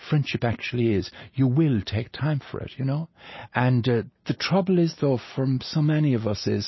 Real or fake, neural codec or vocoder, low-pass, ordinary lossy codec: real; none; 7.2 kHz; MP3, 24 kbps